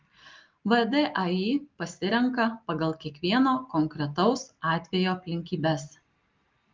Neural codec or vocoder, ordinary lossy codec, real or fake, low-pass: none; Opus, 32 kbps; real; 7.2 kHz